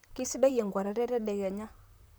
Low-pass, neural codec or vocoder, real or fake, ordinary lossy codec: none; vocoder, 44.1 kHz, 128 mel bands, Pupu-Vocoder; fake; none